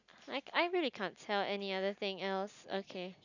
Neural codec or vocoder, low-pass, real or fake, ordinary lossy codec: none; 7.2 kHz; real; none